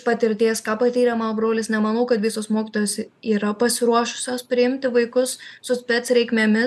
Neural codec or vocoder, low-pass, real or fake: none; 14.4 kHz; real